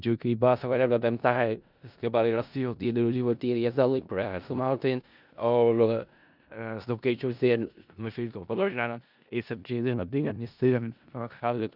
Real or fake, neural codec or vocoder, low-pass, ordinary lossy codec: fake; codec, 16 kHz in and 24 kHz out, 0.4 kbps, LongCat-Audio-Codec, four codebook decoder; 5.4 kHz; none